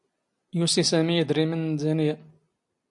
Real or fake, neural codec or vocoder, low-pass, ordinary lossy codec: real; none; 10.8 kHz; AAC, 64 kbps